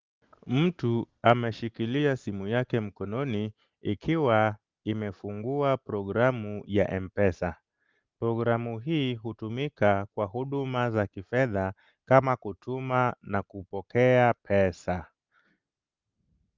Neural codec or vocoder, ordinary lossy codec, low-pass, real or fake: none; Opus, 32 kbps; 7.2 kHz; real